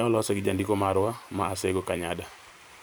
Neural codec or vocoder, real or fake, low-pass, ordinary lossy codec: vocoder, 44.1 kHz, 128 mel bands every 512 samples, BigVGAN v2; fake; none; none